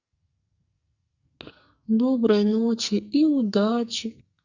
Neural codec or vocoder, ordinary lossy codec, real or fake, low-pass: codec, 44.1 kHz, 2.6 kbps, SNAC; Opus, 64 kbps; fake; 7.2 kHz